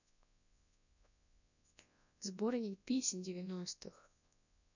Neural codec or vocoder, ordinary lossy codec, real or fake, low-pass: codec, 24 kHz, 0.9 kbps, WavTokenizer, large speech release; none; fake; 7.2 kHz